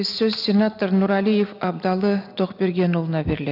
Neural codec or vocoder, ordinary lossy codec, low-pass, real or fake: none; none; 5.4 kHz; real